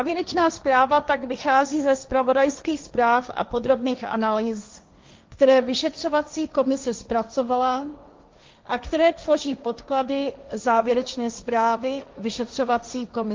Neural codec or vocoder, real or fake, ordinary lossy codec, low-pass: codec, 16 kHz, 1.1 kbps, Voila-Tokenizer; fake; Opus, 24 kbps; 7.2 kHz